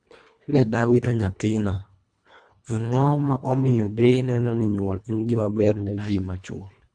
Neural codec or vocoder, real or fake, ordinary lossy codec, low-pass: codec, 24 kHz, 1.5 kbps, HILCodec; fake; Opus, 64 kbps; 9.9 kHz